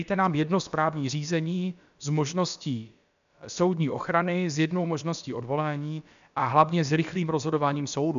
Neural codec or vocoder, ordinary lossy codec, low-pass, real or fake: codec, 16 kHz, about 1 kbps, DyCAST, with the encoder's durations; AAC, 96 kbps; 7.2 kHz; fake